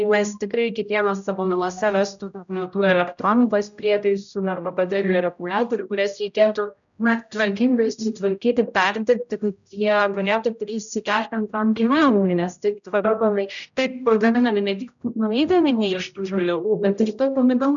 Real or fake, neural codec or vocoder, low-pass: fake; codec, 16 kHz, 0.5 kbps, X-Codec, HuBERT features, trained on general audio; 7.2 kHz